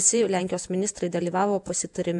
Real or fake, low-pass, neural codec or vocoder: fake; 10.8 kHz; vocoder, 48 kHz, 128 mel bands, Vocos